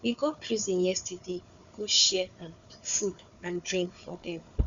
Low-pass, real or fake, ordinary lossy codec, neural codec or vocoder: 7.2 kHz; fake; Opus, 64 kbps; codec, 16 kHz, 4 kbps, FunCodec, trained on Chinese and English, 50 frames a second